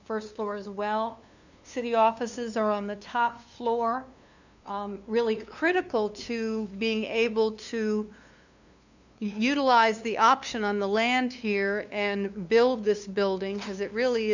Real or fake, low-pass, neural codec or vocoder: fake; 7.2 kHz; codec, 16 kHz, 2 kbps, FunCodec, trained on LibriTTS, 25 frames a second